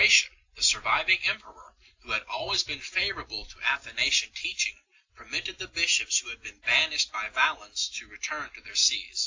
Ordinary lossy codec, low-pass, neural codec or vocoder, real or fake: AAC, 48 kbps; 7.2 kHz; none; real